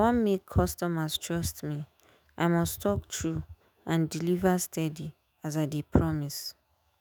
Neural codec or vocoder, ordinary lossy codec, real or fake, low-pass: autoencoder, 48 kHz, 128 numbers a frame, DAC-VAE, trained on Japanese speech; none; fake; none